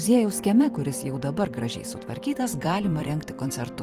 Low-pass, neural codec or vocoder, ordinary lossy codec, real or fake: 14.4 kHz; vocoder, 44.1 kHz, 128 mel bands every 256 samples, BigVGAN v2; Opus, 24 kbps; fake